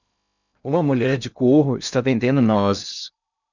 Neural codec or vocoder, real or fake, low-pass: codec, 16 kHz in and 24 kHz out, 0.6 kbps, FocalCodec, streaming, 2048 codes; fake; 7.2 kHz